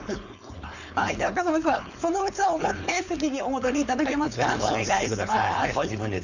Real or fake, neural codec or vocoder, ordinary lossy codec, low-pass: fake; codec, 16 kHz, 4.8 kbps, FACodec; none; 7.2 kHz